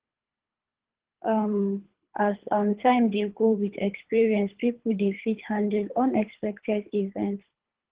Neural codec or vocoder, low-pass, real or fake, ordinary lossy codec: codec, 24 kHz, 3 kbps, HILCodec; 3.6 kHz; fake; Opus, 16 kbps